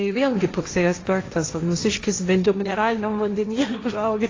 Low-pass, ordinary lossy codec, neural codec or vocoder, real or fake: 7.2 kHz; AAC, 32 kbps; codec, 16 kHz, 1.1 kbps, Voila-Tokenizer; fake